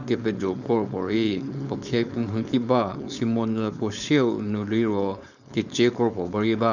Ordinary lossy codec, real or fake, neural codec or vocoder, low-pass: none; fake; codec, 16 kHz, 4.8 kbps, FACodec; 7.2 kHz